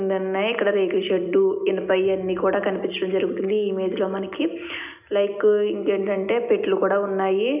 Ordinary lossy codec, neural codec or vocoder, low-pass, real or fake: none; none; 3.6 kHz; real